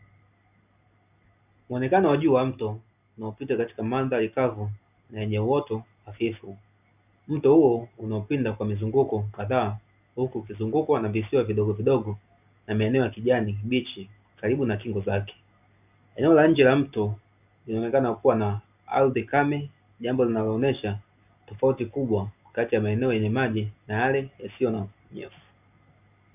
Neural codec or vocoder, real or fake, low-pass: none; real; 3.6 kHz